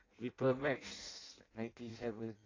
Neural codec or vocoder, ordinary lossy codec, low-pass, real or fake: codec, 16 kHz in and 24 kHz out, 0.6 kbps, FireRedTTS-2 codec; AAC, 32 kbps; 7.2 kHz; fake